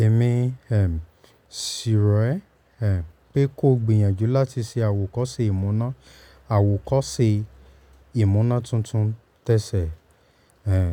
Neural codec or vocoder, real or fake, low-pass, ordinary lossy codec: none; real; 19.8 kHz; none